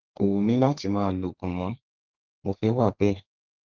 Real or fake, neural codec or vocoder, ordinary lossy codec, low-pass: fake; codec, 32 kHz, 1.9 kbps, SNAC; Opus, 16 kbps; 7.2 kHz